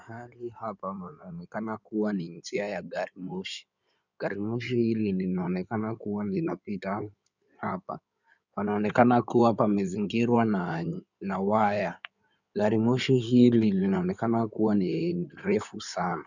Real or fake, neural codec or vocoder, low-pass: fake; codec, 16 kHz in and 24 kHz out, 2.2 kbps, FireRedTTS-2 codec; 7.2 kHz